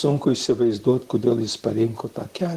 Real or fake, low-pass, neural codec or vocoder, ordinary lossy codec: fake; 14.4 kHz; vocoder, 44.1 kHz, 128 mel bands, Pupu-Vocoder; Opus, 16 kbps